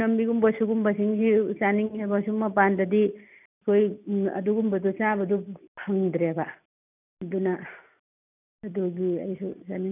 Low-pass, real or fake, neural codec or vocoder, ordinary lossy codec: 3.6 kHz; real; none; none